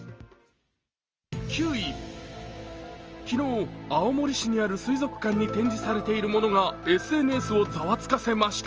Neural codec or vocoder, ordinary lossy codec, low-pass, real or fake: none; Opus, 24 kbps; 7.2 kHz; real